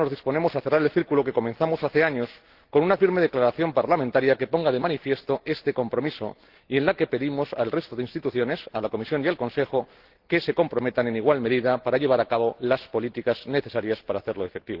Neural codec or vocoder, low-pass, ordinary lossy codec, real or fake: none; 5.4 kHz; Opus, 16 kbps; real